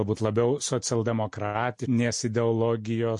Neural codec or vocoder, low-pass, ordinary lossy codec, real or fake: none; 10.8 kHz; MP3, 48 kbps; real